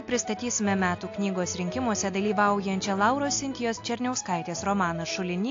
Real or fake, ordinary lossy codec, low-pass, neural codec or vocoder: real; AAC, 48 kbps; 7.2 kHz; none